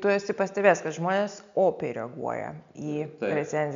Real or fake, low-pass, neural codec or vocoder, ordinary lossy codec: real; 7.2 kHz; none; MP3, 64 kbps